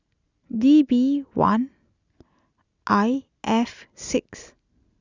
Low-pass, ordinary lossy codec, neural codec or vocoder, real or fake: 7.2 kHz; Opus, 64 kbps; none; real